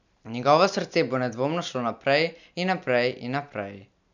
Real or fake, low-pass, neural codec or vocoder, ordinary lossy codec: real; 7.2 kHz; none; none